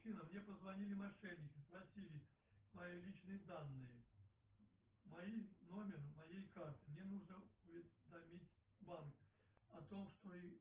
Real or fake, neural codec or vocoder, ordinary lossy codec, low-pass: real; none; Opus, 16 kbps; 3.6 kHz